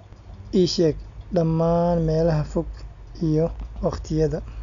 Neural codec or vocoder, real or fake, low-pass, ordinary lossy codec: none; real; 7.2 kHz; none